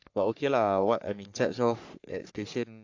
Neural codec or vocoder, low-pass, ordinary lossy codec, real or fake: codec, 44.1 kHz, 3.4 kbps, Pupu-Codec; 7.2 kHz; AAC, 48 kbps; fake